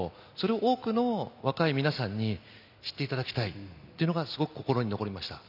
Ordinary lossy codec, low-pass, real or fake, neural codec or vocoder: none; 5.4 kHz; real; none